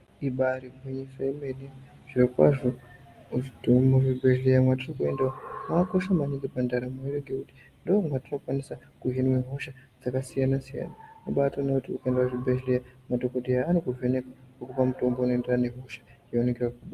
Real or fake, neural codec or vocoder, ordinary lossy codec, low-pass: real; none; Opus, 32 kbps; 14.4 kHz